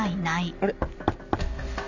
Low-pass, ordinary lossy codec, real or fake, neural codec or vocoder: 7.2 kHz; none; real; none